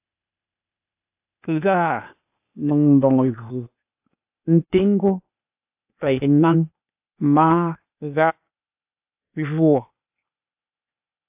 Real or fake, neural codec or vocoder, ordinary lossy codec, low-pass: fake; codec, 16 kHz, 0.8 kbps, ZipCodec; AAC, 32 kbps; 3.6 kHz